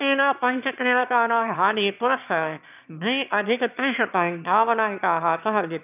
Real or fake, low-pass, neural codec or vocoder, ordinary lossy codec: fake; 3.6 kHz; autoencoder, 22.05 kHz, a latent of 192 numbers a frame, VITS, trained on one speaker; none